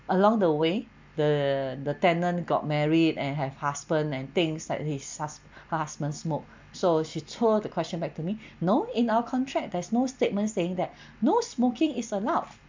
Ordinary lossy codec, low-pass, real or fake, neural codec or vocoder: MP3, 64 kbps; 7.2 kHz; real; none